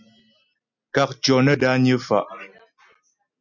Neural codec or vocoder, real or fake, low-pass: none; real; 7.2 kHz